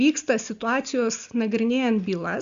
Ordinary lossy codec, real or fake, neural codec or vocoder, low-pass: Opus, 64 kbps; real; none; 7.2 kHz